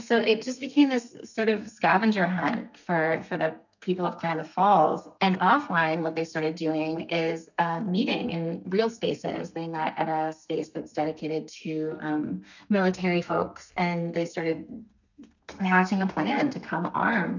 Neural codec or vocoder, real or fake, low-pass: codec, 32 kHz, 1.9 kbps, SNAC; fake; 7.2 kHz